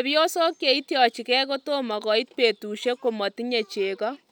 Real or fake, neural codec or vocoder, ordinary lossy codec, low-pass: real; none; none; 19.8 kHz